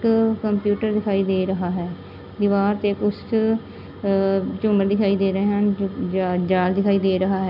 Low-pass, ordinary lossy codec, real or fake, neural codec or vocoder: 5.4 kHz; none; real; none